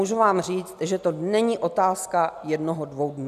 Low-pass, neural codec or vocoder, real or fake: 14.4 kHz; none; real